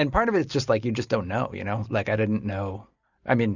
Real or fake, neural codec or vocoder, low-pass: real; none; 7.2 kHz